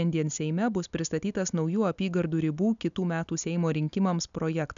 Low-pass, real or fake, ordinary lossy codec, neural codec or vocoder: 7.2 kHz; real; MP3, 96 kbps; none